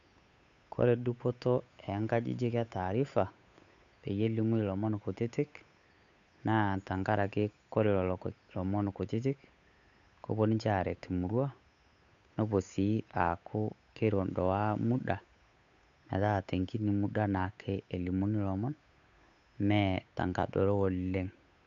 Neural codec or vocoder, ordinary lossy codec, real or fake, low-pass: codec, 16 kHz, 8 kbps, FunCodec, trained on Chinese and English, 25 frames a second; none; fake; 7.2 kHz